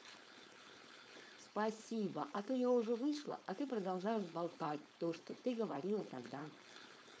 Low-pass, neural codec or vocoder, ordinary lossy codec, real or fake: none; codec, 16 kHz, 4.8 kbps, FACodec; none; fake